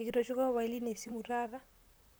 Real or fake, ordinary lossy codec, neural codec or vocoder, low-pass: fake; none; vocoder, 44.1 kHz, 128 mel bands, Pupu-Vocoder; none